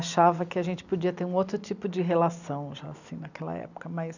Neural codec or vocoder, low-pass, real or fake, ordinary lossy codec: none; 7.2 kHz; real; none